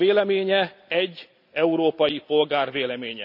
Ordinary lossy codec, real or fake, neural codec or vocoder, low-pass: none; real; none; 5.4 kHz